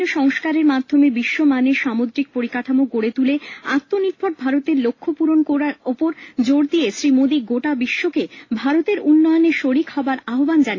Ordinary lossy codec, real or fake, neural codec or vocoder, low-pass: AAC, 32 kbps; real; none; 7.2 kHz